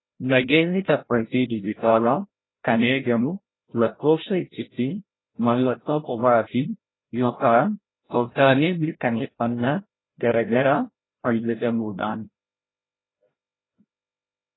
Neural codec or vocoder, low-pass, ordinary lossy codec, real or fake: codec, 16 kHz, 0.5 kbps, FreqCodec, larger model; 7.2 kHz; AAC, 16 kbps; fake